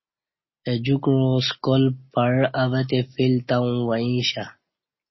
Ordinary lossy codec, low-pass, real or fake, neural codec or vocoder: MP3, 24 kbps; 7.2 kHz; real; none